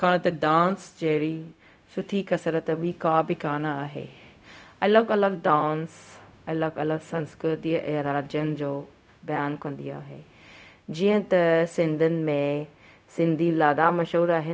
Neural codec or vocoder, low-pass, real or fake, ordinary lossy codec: codec, 16 kHz, 0.4 kbps, LongCat-Audio-Codec; none; fake; none